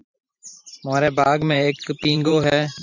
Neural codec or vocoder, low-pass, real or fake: vocoder, 44.1 kHz, 128 mel bands every 512 samples, BigVGAN v2; 7.2 kHz; fake